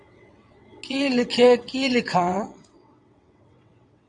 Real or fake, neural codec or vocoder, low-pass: fake; vocoder, 22.05 kHz, 80 mel bands, WaveNeXt; 9.9 kHz